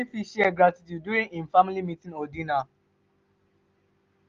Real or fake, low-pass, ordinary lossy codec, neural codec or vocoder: real; 7.2 kHz; Opus, 32 kbps; none